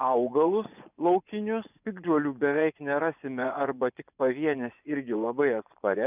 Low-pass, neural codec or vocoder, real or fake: 3.6 kHz; vocoder, 24 kHz, 100 mel bands, Vocos; fake